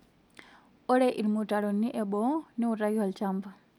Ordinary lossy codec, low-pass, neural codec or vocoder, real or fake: none; 19.8 kHz; none; real